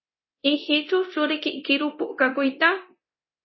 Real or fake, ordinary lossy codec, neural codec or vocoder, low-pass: fake; MP3, 24 kbps; codec, 24 kHz, 0.9 kbps, DualCodec; 7.2 kHz